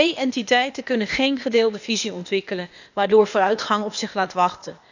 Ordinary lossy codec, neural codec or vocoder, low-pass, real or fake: none; codec, 16 kHz, 0.8 kbps, ZipCodec; 7.2 kHz; fake